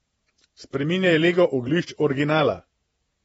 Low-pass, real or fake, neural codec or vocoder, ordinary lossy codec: 19.8 kHz; fake; vocoder, 48 kHz, 128 mel bands, Vocos; AAC, 24 kbps